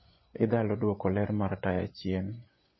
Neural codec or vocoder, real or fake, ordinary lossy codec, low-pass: vocoder, 24 kHz, 100 mel bands, Vocos; fake; MP3, 24 kbps; 7.2 kHz